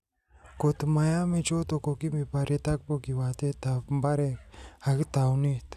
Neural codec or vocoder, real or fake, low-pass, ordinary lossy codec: none; real; 14.4 kHz; none